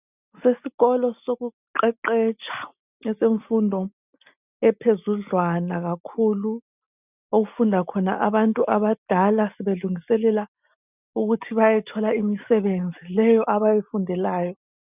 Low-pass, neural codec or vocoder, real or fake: 3.6 kHz; none; real